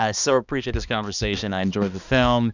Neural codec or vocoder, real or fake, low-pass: codec, 16 kHz, 2 kbps, X-Codec, HuBERT features, trained on balanced general audio; fake; 7.2 kHz